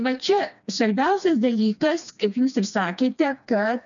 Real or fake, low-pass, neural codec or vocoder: fake; 7.2 kHz; codec, 16 kHz, 2 kbps, FreqCodec, smaller model